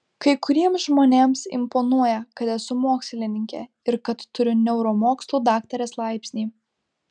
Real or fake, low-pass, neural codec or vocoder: real; 9.9 kHz; none